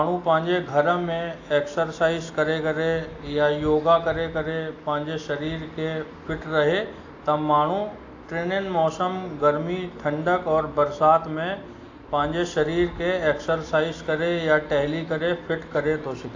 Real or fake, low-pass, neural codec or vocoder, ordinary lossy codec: real; 7.2 kHz; none; AAC, 48 kbps